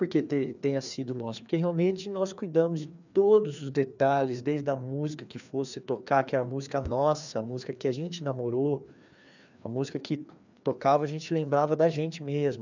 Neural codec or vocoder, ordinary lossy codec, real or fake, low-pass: codec, 16 kHz, 2 kbps, FreqCodec, larger model; none; fake; 7.2 kHz